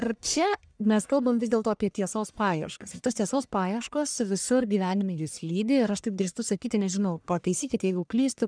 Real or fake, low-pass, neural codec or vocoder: fake; 9.9 kHz; codec, 44.1 kHz, 1.7 kbps, Pupu-Codec